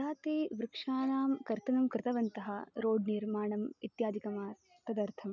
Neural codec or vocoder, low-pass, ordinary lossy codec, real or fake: none; 7.2 kHz; none; real